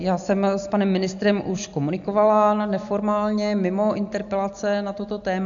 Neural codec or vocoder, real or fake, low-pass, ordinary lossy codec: none; real; 7.2 kHz; AAC, 64 kbps